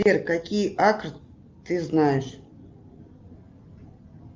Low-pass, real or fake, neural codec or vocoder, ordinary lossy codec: 7.2 kHz; real; none; Opus, 32 kbps